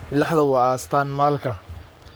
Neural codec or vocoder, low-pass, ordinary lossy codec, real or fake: codec, 44.1 kHz, 3.4 kbps, Pupu-Codec; none; none; fake